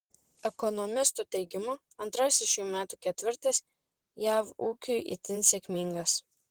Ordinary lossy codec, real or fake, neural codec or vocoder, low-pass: Opus, 16 kbps; fake; vocoder, 44.1 kHz, 128 mel bands, Pupu-Vocoder; 19.8 kHz